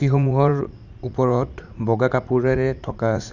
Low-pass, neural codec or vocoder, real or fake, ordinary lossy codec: 7.2 kHz; vocoder, 44.1 kHz, 80 mel bands, Vocos; fake; none